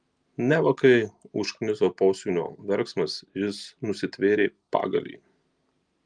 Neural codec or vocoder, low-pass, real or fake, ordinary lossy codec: none; 9.9 kHz; real; Opus, 32 kbps